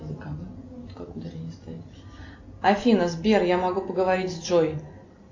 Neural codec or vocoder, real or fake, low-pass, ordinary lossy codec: none; real; 7.2 kHz; AAC, 48 kbps